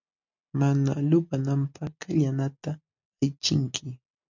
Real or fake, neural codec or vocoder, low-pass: real; none; 7.2 kHz